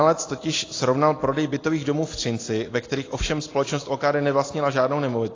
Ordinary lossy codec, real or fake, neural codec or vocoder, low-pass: AAC, 32 kbps; real; none; 7.2 kHz